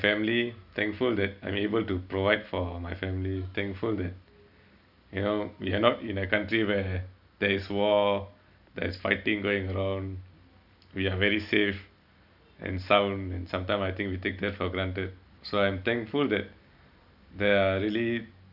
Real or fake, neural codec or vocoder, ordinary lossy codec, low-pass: real; none; none; 5.4 kHz